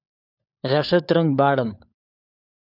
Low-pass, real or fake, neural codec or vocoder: 5.4 kHz; fake; codec, 16 kHz, 4 kbps, FunCodec, trained on LibriTTS, 50 frames a second